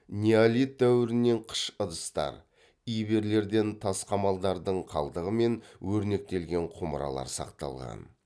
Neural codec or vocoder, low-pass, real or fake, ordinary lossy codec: none; none; real; none